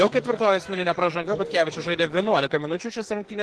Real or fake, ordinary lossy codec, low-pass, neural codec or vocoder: fake; Opus, 16 kbps; 10.8 kHz; codec, 44.1 kHz, 2.6 kbps, SNAC